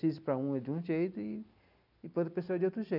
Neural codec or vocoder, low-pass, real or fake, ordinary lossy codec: none; 5.4 kHz; real; none